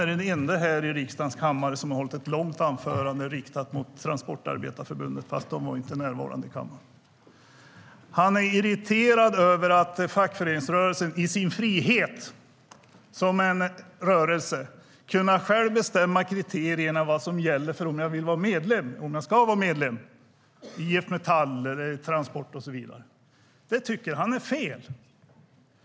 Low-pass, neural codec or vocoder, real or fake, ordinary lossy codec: none; none; real; none